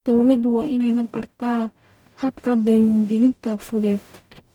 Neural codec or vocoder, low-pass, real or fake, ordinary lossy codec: codec, 44.1 kHz, 0.9 kbps, DAC; 19.8 kHz; fake; none